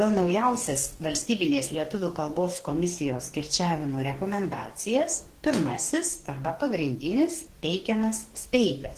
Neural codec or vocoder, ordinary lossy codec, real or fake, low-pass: codec, 44.1 kHz, 2.6 kbps, DAC; Opus, 16 kbps; fake; 14.4 kHz